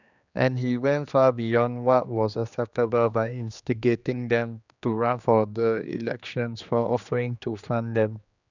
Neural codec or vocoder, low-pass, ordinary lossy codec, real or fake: codec, 16 kHz, 2 kbps, X-Codec, HuBERT features, trained on general audio; 7.2 kHz; none; fake